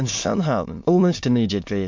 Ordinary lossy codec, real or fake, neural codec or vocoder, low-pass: MP3, 48 kbps; fake; autoencoder, 22.05 kHz, a latent of 192 numbers a frame, VITS, trained on many speakers; 7.2 kHz